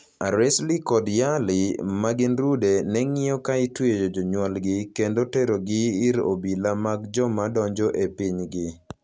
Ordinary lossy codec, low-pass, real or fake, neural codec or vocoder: none; none; real; none